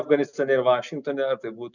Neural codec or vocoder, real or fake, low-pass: none; real; 7.2 kHz